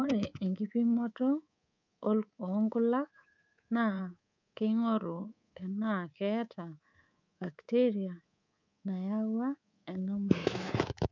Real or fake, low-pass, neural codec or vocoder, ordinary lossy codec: fake; 7.2 kHz; codec, 24 kHz, 3.1 kbps, DualCodec; none